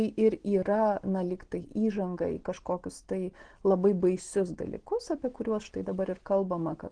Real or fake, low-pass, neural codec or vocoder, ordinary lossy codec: real; 9.9 kHz; none; Opus, 16 kbps